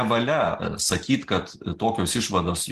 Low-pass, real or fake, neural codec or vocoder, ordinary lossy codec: 14.4 kHz; real; none; Opus, 16 kbps